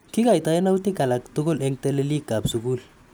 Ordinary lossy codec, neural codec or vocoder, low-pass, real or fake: none; none; none; real